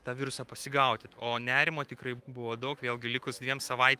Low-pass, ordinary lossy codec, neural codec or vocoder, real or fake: 14.4 kHz; Opus, 32 kbps; autoencoder, 48 kHz, 128 numbers a frame, DAC-VAE, trained on Japanese speech; fake